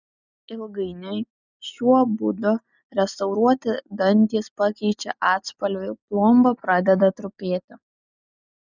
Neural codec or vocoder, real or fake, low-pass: none; real; 7.2 kHz